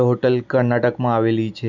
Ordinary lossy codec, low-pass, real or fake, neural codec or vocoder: none; 7.2 kHz; real; none